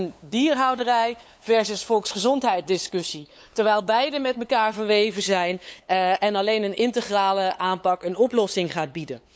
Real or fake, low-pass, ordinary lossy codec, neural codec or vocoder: fake; none; none; codec, 16 kHz, 8 kbps, FunCodec, trained on LibriTTS, 25 frames a second